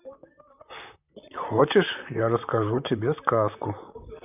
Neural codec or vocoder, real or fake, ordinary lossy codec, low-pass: vocoder, 44.1 kHz, 128 mel bands, Pupu-Vocoder; fake; none; 3.6 kHz